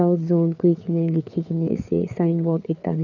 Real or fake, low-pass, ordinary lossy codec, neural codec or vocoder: fake; 7.2 kHz; none; codec, 16 kHz, 4 kbps, FreqCodec, larger model